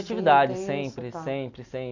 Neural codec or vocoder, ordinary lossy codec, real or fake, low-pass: none; none; real; 7.2 kHz